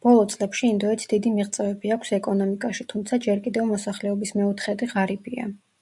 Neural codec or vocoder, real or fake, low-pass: none; real; 10.8 kHz